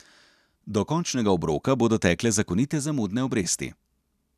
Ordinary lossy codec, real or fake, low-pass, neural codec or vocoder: none; real; 14.4 kHz; none